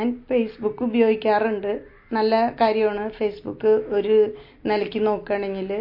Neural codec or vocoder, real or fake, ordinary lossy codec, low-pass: none; real; MP3, 32 kbps; 5.4 kHz